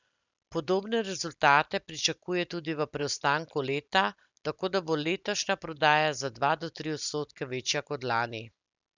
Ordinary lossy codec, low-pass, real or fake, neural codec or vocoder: none; 7.2 kHz; real; none